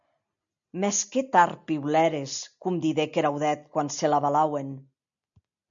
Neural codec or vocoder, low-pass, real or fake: none; 7.2 kHz; real